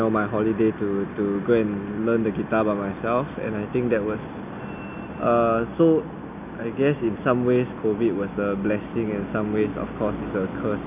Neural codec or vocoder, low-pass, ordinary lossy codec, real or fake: none; 3.6 kHz; none; real